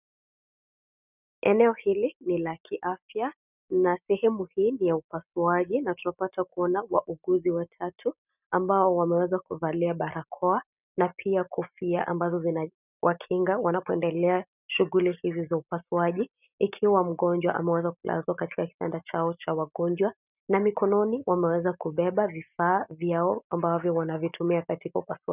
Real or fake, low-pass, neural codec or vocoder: real; 3.6 kHz; none